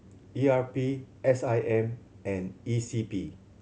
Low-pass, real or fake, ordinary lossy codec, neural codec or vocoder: none; real; none; none